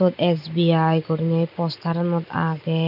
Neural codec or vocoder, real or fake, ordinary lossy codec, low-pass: none; real; none; 5.4 kHz